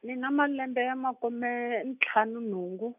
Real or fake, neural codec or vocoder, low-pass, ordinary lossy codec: real; none; 3.6 kHz; none